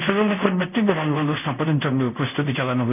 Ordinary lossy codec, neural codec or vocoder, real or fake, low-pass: none; codec, 24 kHz, 0.5 kbps, DualCodec; fake; 3.6 kHz